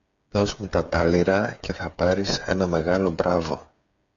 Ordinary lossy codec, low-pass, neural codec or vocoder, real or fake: AAC, 64 kbps; 7.2 kHz; codec, 16 kHz, 8 kbps, FreqCodec, smaller model; fake